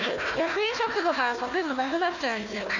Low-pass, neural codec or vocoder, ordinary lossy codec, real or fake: 7.2 kHz; codec, 16 kHz, 1 kbps, FunCodec, trained on Chinese and English, 50 frames a second; none; fake